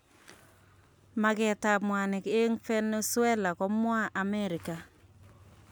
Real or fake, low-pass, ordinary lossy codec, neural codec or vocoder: real; none; none; none